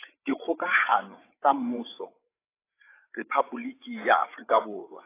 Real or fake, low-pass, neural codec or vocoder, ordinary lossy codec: fake; 3.6 kHz; codec, 16 kHz, 8 kbps, FreqCodec, larger model; AAC, 24 kbps